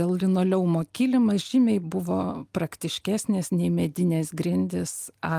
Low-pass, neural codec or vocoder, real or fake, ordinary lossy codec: 14.4 kHz; none; real; Opus, 32 kbps